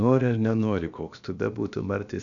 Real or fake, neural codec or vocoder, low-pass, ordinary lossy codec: fake; codec, 16 kHz, about 1 kbps, DyCAST, with the encoder's durations; 7.2 kHz; MP3, 96 kbps